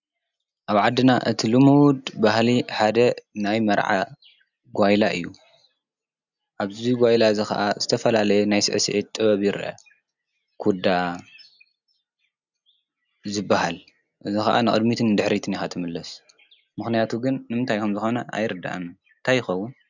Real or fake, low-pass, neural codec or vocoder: real; 7.2 kHz; none